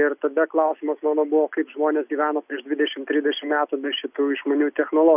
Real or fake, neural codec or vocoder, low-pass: real; none; 3.6 kHz